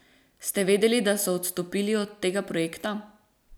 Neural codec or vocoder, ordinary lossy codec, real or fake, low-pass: none; none; real; none